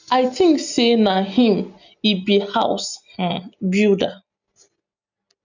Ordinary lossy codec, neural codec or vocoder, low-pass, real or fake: none; none; 7.2 kHz; real